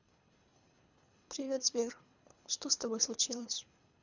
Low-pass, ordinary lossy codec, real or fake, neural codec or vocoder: 7.2 kHz; none; fake; codec, 24 kHz, 3 kbps, HILCodec